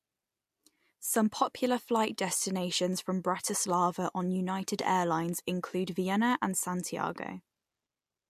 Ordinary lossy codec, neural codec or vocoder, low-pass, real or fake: MP3, 64 kbps; none; 14.4 kHz; real